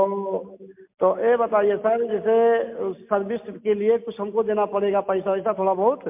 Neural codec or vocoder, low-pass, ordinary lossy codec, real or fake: none; 3.6 kHz; MP3, 32 kbps; real